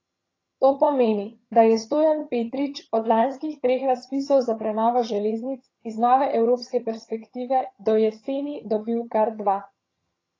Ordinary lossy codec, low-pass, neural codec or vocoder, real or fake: AAC, 32 kbps; 7.2 kHz; vocoder, 22.05 kHz, 80 mel bands, HiFi-GAN; fake